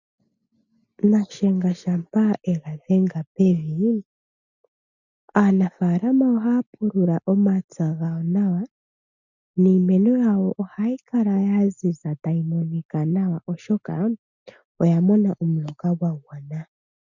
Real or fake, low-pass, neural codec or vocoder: real; 7.2 kHz; none